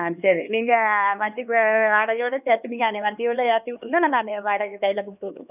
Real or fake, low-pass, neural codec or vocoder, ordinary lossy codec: fake; 3.6 kHz; codec, 16 kHz, 2 kbps, X-Codec, HuBERT features, trained on LibriSpeech; none